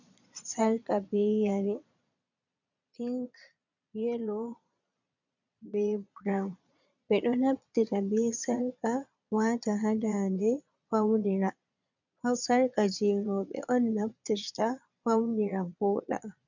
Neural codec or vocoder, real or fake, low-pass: vocoder, 22.05 kHz, 80 mel bands, Vocos; fake; 7.2 kHz